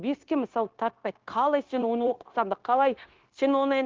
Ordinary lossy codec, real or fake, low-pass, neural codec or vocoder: Opus, 16 kbps; fake; 7.2 kHz; codec, 16 kHz, 0.9 kbps, LongCat-Audio-Codec